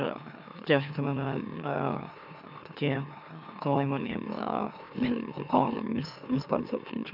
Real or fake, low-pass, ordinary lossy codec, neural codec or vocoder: fake; 5.4 kHz; none; autoencoder, 44.1 kHz, a latent of 192 numbers a frame, MeloTTS